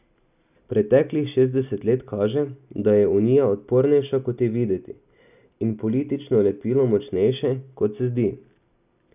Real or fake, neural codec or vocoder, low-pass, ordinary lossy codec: real; none; 3.6 kHz; none